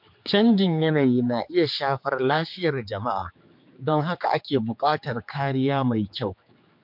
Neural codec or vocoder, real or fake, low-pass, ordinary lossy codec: codec, 16 kHz, 4 kbps, X-Codec, HuBERT features, trained on general audio; fake; 5.4 kHz; MP3, 48 kbps